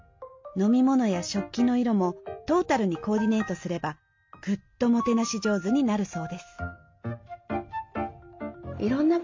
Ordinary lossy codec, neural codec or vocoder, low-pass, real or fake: MP3, 48 kbps; none; 7.2 kHz; real